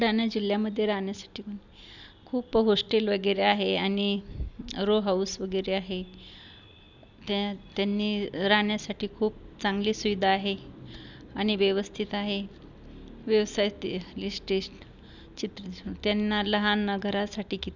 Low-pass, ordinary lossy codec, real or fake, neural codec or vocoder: 7.2 kHz; none; real; none